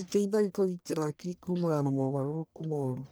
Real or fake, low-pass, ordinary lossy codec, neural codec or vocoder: fake; none; none; codec, 44.1 kHz, 1.7 kbps, Pupu-Codec